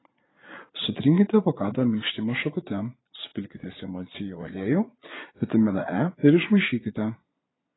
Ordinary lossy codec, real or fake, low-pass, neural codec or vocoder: AAC, 16 kbps; fake; 7.2 kHz; vocoder, 22.05 kHz, 80 mel bands, Vocos